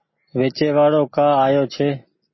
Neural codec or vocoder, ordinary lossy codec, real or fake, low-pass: none; MP3, 24 kbps; real; 7.2 kHz